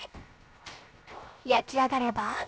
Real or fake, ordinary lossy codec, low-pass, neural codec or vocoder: fake; none; none; codec, 16 kHz, 0.7 kbps, FocalCodec